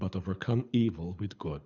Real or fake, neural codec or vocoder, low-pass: fake; codec, 24 kHz, 6 kbps, HILCodec; 7.2 kHz